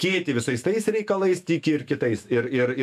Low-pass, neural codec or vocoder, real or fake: 14.4 kHz; autoencoder, 48 kHz, 128 numbers a frame, DAC-VAE, trained on Japanese speech; fake